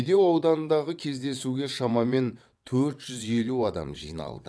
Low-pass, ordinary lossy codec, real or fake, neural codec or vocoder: none; none; fake; vocoder, 22.05 kHz, 80 mel bands, WaveNeXt